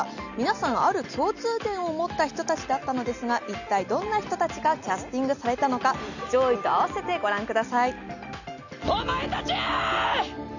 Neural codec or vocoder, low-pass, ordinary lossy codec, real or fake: none; 7.2 kHz; none; real